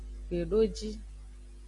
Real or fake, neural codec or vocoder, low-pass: real; none; 10.8 kHz